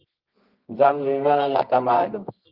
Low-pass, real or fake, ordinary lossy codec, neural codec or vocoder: 5.4 kHz; fake; Opus, 32 kbps; codec, 24 kHz, 0.9 kbps, WavTokenizer, medium music audio release